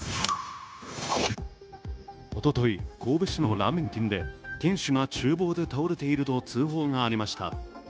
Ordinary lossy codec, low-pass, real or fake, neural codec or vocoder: none; none; fake; codec, 16 kHz, 0.9 kbps, LongCat-Audio-Codec